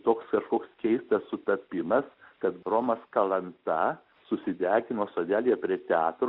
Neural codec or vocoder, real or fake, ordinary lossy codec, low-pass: none; real; Opus, 64 kbps; 5.4 kHz